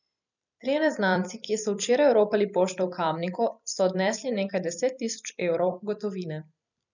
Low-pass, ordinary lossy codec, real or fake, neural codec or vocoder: 7.2 kHz; none; fake; vocoder, 44.1 kHz, 128 mel bands every 256 samples, BigVGAN v2